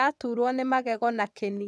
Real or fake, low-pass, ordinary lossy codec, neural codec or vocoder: fake; none; none; vocoder, 22.05 kHz, 80 mel bands, WaveNeXt